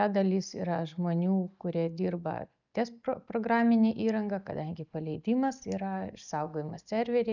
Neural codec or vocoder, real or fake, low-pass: none; real; 7.2 kHz